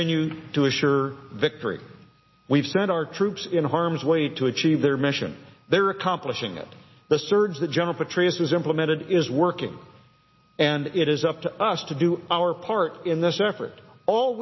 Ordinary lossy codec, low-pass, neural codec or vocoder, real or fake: MP3, 24 kbps; 7.2 kHz; none; real